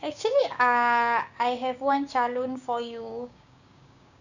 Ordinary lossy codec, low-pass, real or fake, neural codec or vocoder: none; 7.2 kHz; fake; codec, 16 kHz, 6 kbps, DAC